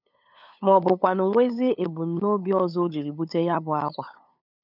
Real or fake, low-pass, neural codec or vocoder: fake; 5.4 kHz; codec, 16 kHz, 8 kbps, FunCodec, trained on LibriTTS, 25 frames a second